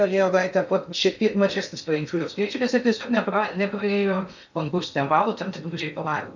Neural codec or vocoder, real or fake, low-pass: codec, 16 kHz in and 24 kHz out, 0.6 kbps, FocalCodec, streaming, 2048 codes; fake; 7.2 kHz